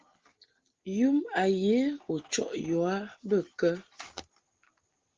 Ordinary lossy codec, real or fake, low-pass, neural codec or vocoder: Opus, 16 kbps; real; 7.2 kHz; none